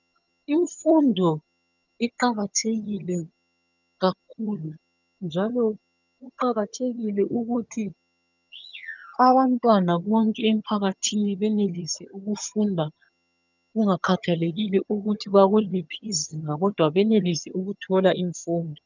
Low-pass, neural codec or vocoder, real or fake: 7.2 kHz; vocoder, 22.05 kHz, 80 mel bands, HiFi-GAN; fake